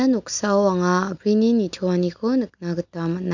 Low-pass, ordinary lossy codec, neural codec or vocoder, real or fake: 7.2 kHz; none; none; real